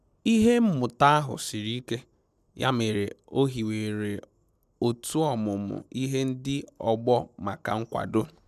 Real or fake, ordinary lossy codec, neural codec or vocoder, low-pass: real; none; none; 14.4 kHz